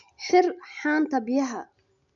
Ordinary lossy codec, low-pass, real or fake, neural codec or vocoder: none; 7.2 kHz; real; none